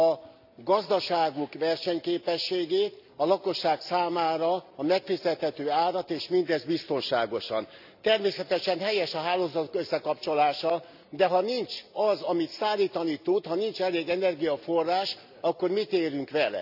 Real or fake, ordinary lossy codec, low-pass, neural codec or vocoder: real; none; 5.4 kHz; none